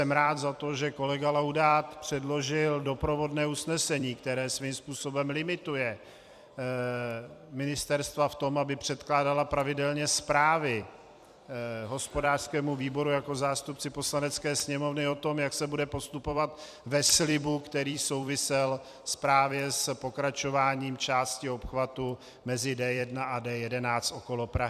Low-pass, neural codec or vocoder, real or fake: 14.4 kHz; vocoder, 44.1 kHz, 128 mel bands every 256 samples, BigVGAN v2; fake